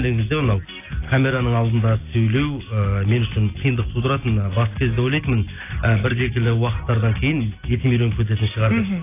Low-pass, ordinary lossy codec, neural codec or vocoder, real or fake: 3.6 kHz; AAC, 24 kbps; none; real